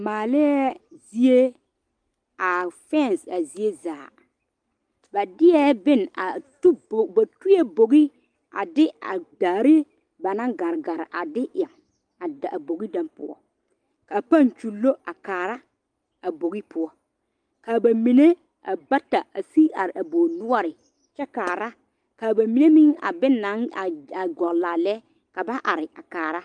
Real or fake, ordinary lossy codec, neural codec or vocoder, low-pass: real; Opus, 32 kbps; none; 9.9 kHz